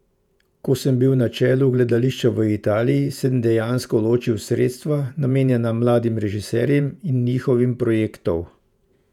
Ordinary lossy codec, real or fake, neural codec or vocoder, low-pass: none; fake; vocoder, 48 kHz, 128 mel bands, Vocos; 19.8 kHz